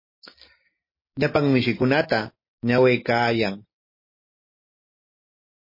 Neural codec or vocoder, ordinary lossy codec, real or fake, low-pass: none; MP3, 24 kbps; real; 5.4 kHz